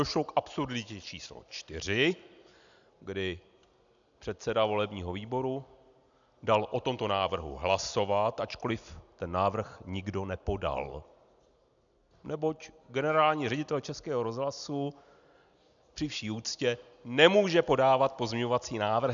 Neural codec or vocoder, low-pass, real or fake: none; 7.2 kHz; real